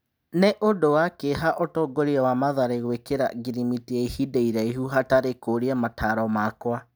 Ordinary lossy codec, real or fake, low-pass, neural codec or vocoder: none; real; none; none